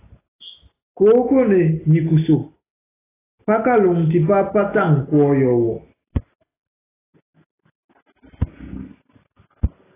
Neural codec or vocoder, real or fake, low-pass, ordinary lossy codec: none; real; 3.6 kHz; AAC, 16 kbps